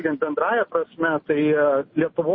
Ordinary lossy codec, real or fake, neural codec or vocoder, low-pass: MP3, 24 kbps; real; none; 7.2 kHz